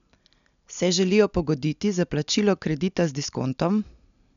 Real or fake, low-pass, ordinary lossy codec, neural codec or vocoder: real; 7.2 kHz; none; none